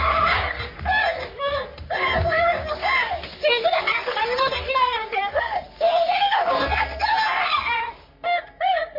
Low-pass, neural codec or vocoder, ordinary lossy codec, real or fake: 5.4 kHz; codec, 44.1 kHz, 3.4 kbps, Pupu-Codec; MP3, 32 kbps; fake